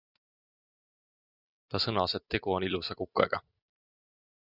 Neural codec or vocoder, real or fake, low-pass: none; real; 5.4 kHz